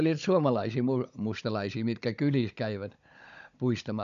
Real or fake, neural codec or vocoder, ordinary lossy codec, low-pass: fake; codec, 16 kHz, 16 kbps, FunCodec, trained on Chinese and English, 50 frames a second; none; 7.2 kHz